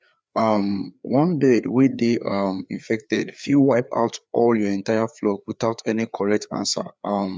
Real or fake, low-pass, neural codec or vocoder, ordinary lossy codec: fake; none; codec, 16 kHz, 4 kbps, FreqCodec, larger model; none